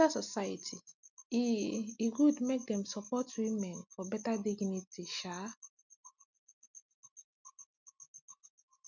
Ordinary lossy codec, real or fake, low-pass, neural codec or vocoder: none; real; 7.2 kHz; none